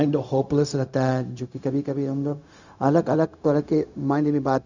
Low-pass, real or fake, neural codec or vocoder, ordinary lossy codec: 7.2 kHz; fake; codec, 16 kHz, 0.4 kbps, LongCat-Audio-Codec; none